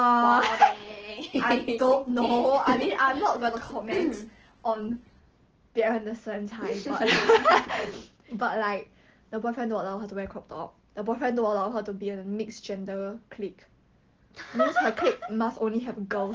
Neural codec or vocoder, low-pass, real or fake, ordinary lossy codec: none; 7.2 kHz; real; Opus, 16 kbps